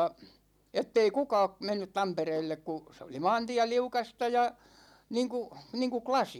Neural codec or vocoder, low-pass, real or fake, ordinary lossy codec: none; 19.8 kHz; real; none